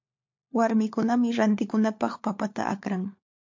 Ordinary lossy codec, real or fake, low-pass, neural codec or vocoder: MP3, 48 kbps; fake; 7.2 kHz; codec, 16 kHz, 4 kbps, FunCodec, trained on LibriTTS, 50 frames a second